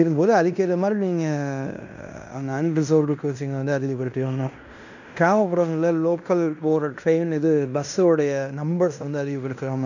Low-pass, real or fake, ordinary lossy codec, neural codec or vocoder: 7.2 kHz; fake; none; codec, 16 kHz in and 24 kHz out, 0.9 kbps, LongCat-Audio-Codec, fine tuned four codebook decoder